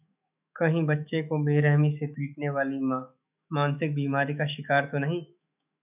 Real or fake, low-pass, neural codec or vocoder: fake; 3.6 kHz; autoencoder, 48 kHz, 128 numbers a frame, DAC-VAE, trained on Japanese speech